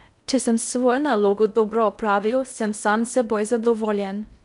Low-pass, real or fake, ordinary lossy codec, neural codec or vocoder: 10.8 kHz; fake; none; codec, 16 kHz in and 24 kHz out, 0.8 kbps, FocalCodec, streaming, 65536 codes